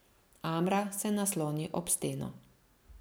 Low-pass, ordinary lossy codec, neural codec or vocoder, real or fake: none; none; none; real